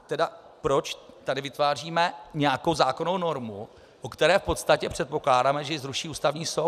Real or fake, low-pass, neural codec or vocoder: fake; 14.4 kHz; vocoder, 44.1 kHz, 128 mel bands every 256 samples, BigVGAN v2